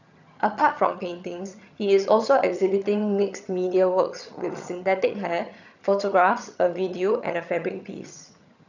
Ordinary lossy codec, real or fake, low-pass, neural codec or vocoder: none; fake; 7.2 kHz; vocoder, 22.05 kHz, 80 mel bands, HiFi-GAN